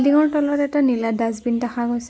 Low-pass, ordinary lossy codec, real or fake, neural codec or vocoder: none; none; real; none